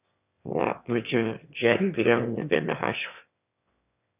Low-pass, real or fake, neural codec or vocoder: 3.6 kHz; fake; autoencoder, 22.05 kHz, a latent of 192 numbers a frame, VITS, trained on one speaker